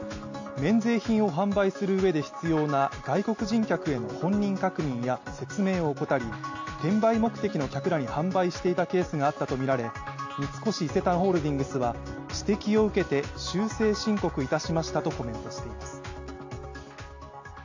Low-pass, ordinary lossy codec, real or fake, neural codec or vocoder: 7.2 kHz; AAC, 48 kbps; real; none